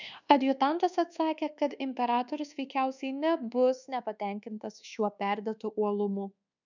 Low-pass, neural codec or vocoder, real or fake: 7.2 kHz; codec, 24 kHz, 1.2 kbps, DualCodec; fake